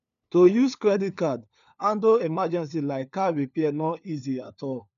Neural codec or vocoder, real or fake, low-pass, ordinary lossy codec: codec, 16 kHz, 4 kbps, FunCodec, trained on LibriTTS, 50 frames a second; fake; 7.2 kHz; none